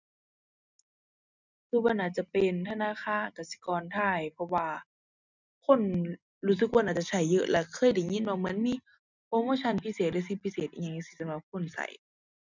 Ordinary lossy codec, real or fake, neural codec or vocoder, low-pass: none; real; none; 7.2 kHz